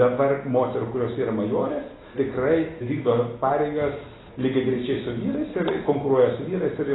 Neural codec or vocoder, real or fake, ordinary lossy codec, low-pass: none; real; AAC, 16 kbps; 7.2 kHz